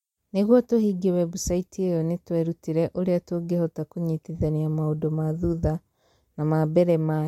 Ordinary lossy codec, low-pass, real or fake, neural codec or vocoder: MP3, 64 kbps; 19.8 kHz; real; none